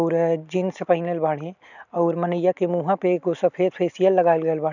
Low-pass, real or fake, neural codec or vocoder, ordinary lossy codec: 7.2 kHz; real; none; none